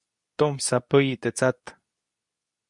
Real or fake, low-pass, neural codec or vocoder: fake; 10.8 kHz; codec, 24 kHz, 0.9 kbps, WavTokenizer, medium speech release version 2